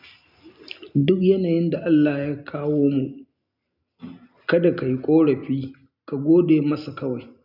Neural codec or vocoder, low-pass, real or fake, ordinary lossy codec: none; 5.4 kHz; real; none